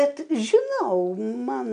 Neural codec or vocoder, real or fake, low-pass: none; real; 9.9 kHz